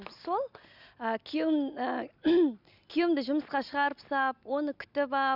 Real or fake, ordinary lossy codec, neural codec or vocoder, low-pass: real; none; none; 5.4 kHz